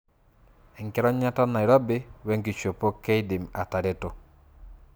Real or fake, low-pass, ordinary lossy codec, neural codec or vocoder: real; none; none; none